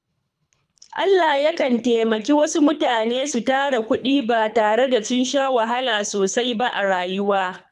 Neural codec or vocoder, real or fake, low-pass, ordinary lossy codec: codec, 24 kHz, 3 kbps, HILCodec; fake; none; none